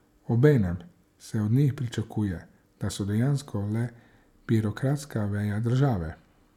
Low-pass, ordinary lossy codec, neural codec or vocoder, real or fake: 19.8 kHz; none; none; real